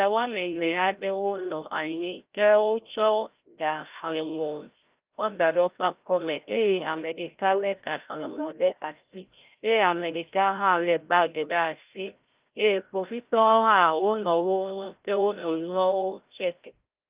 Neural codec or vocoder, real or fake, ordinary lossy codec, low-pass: codec, 16 kHz, 0.5 kbps, FreqCodec, larger model; fake; Opus, 32 kbps; 3.6 kHz